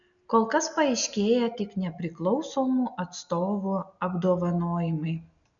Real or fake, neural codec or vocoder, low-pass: real; none; 7.2 kHz